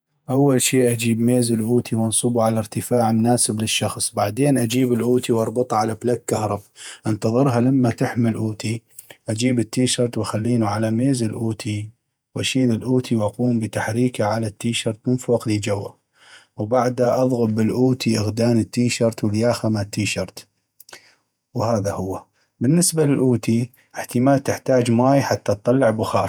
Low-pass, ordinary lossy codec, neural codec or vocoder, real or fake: none; none; vocoder, 48 kHz, 128 mel bands, Vocos; fake